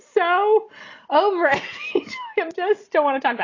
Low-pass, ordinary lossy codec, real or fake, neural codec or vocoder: 7.2 kHz; AAC, 32 kbps; real; none